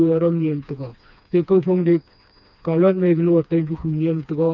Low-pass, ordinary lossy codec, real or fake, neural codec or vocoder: 7.2 kHz; none; fake; codec, 16 kHz, 2 kbps, FreqCodec, smaller model